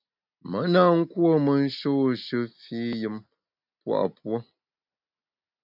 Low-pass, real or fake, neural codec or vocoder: 5.4 kHz; real; none